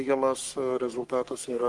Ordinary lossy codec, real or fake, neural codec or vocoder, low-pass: Opus, 32 kbps; fake; codec, 44.1 kHz, 3.4 kbps, Pupu-Codec; 10.8 kHz